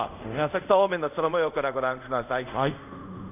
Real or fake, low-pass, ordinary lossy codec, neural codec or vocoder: fake; 3.6 kHz; none; codec, 24 kHz, 0.5 kbps, DualCodec